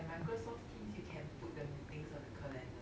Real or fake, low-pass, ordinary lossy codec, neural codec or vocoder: real; none; none; none